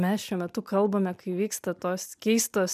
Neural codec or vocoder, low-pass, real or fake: none; 14.4 kHz; real